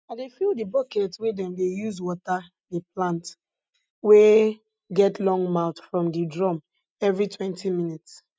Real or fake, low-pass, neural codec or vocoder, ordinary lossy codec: real; none; none; none